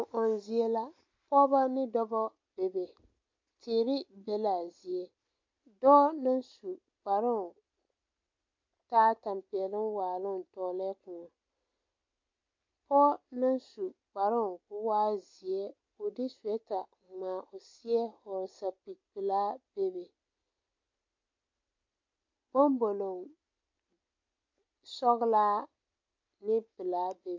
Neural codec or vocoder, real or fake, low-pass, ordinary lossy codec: none; real; 7.2 kHz; MP3, 48 kbps